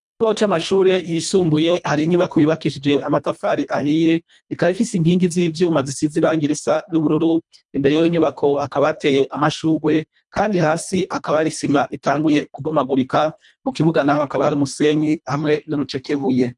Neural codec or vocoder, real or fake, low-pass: codec, 24 kHz, 1.5 kbps, HILCodec; fake; 10.8 kHz